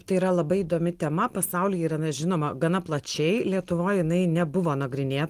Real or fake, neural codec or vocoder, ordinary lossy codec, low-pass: real; none; Opus, 24 kbps; 14.4 kHz